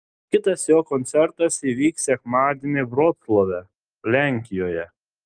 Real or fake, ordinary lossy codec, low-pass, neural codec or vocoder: real; Opus, 32 kbps; 9.9 kHz; none